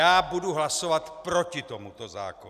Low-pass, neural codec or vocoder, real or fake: 14.4 kHz; none; real